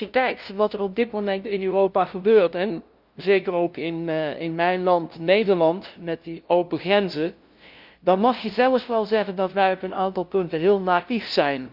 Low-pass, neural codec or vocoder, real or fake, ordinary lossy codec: 5.4 kHz; codec, 16 kHz, 0.5 kbps, FunCodec, trained on LibriTTS, 25 frames a second; fake; Opus, 24 kbps